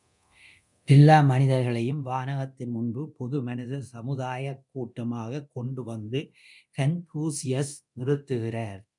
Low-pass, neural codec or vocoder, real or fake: 10.8 kHz; codec, 24 kHz, 0.9 kbps, DualCodec; fake